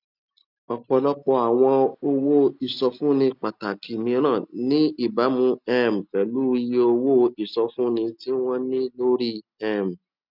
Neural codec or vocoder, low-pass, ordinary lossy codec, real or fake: none; 5.4 kHz; none; real